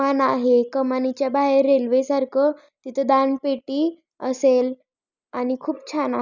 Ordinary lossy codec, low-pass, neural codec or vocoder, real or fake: none; 7.2 kHz; none; real